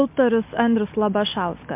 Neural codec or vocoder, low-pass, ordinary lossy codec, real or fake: none; 3.6 kHz; MP3, 32 kbps; real